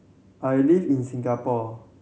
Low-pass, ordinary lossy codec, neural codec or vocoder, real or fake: none; none; none; real